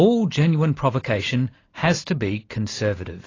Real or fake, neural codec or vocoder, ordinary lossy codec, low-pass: real; none; AAC, 32 kbps; 7.2 kHz